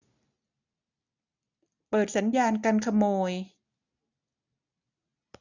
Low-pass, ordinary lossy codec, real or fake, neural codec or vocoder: 7.2 kHz; none; real; none